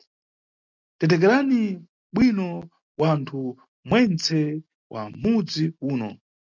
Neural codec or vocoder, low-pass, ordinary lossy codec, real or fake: none; 7.2 kHz; MP3, 64 kbps; real